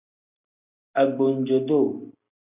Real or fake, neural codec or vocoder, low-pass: real; none; 3.6 kHz